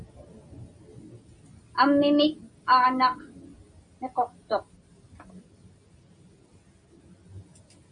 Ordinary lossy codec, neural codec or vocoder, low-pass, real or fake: MP3, 48 kbps; none; 9.9 kHz; real